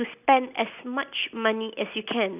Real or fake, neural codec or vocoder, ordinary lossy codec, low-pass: real; none; none; 3.6 kHz